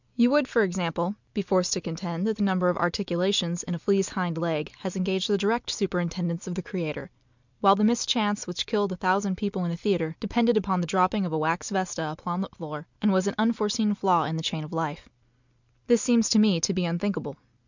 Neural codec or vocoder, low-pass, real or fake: none; 7.2 kHz; real